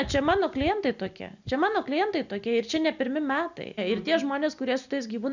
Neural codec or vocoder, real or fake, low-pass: none; real; 7.2 kHz